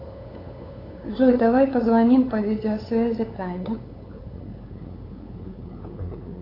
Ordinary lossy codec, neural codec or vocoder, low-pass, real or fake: AAC, 24 kbps; codec, 16 kHz, 8 kbps, FunCodec, trained on LibriTTS, 25 frames a second; 5.4 kHz; fake